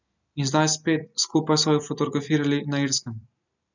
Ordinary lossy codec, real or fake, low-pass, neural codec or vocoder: none; real; 7.2 kHz; none